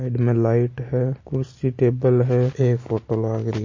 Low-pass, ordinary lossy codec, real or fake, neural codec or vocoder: 7.2 kHz; MP3, 32 kbps; real; none